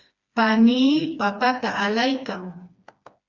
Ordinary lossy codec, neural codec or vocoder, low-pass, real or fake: Opus, 64 kbps; codec, 16 kHz, 2 kbps, FreqCodec, smaller model; 7.2 kHz; fake